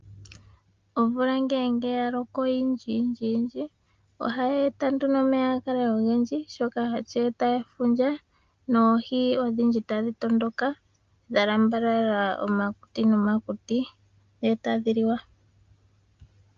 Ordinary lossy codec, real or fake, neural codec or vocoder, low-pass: Opus, 24 kbps; real; none; 7.2 kHz